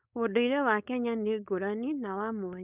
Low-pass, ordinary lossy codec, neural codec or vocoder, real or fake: 3.6 kHz; none; codec, 16 kHz, 4.8 kbps, FACodec; fake